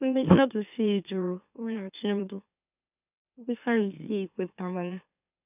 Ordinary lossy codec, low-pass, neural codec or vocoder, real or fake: none; 3.6 kHz; autoencoder, 44.1 kHz, a latent of 192 numbers a frame, MeloTTS; fake